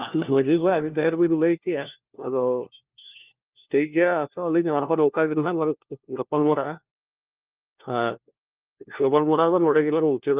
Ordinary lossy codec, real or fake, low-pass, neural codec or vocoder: Opus, 32 kbps; fake; 3.6 kHz; codec, 16 kHz, 1 kbps, FunCodec, trained on LibriTTS, 50 frames a second